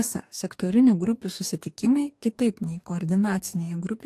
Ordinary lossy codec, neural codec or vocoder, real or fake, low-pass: AAC, 64 kbps; codec, 44.1 kHz, 2.6 kbps, DAC; fake; 14.4 kHz